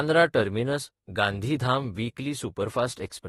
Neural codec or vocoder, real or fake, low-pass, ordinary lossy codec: autoencoder, 48 kHz, 32 numbers a frame, DAC-VAE, trained on Japanese speech; fake; 19.8 kHz; AAC, 32 kbps